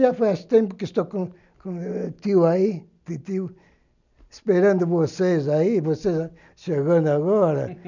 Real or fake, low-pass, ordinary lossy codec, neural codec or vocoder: real; 7.2 kHz; none; none